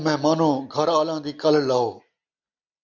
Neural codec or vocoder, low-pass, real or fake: none; 7.2 kHz; real